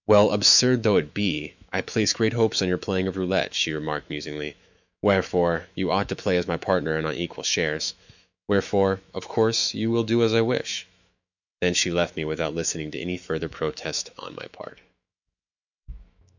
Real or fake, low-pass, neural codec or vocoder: fake; 7.2 kHz; autoencoder, 48 kHz, 128 numbers a frame, DAC-VAE, trained on Japanese speech